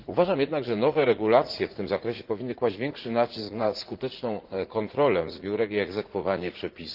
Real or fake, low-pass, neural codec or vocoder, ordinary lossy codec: fake; 5.4 kHz; vocoder, 44.1 kHz, 80 mel bands, Vocos; Opus, 16 kbps